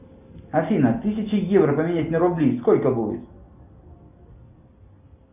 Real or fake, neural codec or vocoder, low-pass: real; none; 3.6 kHz